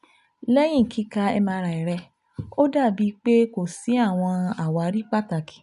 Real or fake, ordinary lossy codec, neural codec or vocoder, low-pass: real; none; none; 10.8 kHz